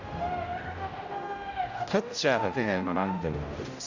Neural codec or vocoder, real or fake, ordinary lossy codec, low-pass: codec, 16 kHz, 0.5 kbps, X-Codec, HuBERT features, trained on general audio; fake; Opus, 64 kbps; 7.2 kHz